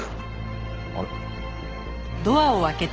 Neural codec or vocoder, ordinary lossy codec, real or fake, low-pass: none; Opus, 16 kbps; real; 7.2 kHz